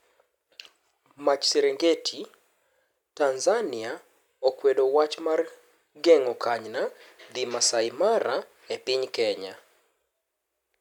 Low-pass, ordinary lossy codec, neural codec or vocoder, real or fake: 19.8 kHz; none; none; real